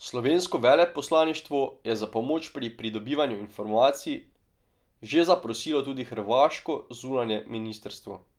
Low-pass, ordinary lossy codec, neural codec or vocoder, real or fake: 19.8 kHz; Opus, 32 kbps; none; real